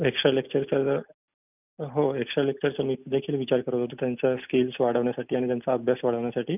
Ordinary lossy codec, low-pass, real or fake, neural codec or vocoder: none; 3.6 kHz; real; none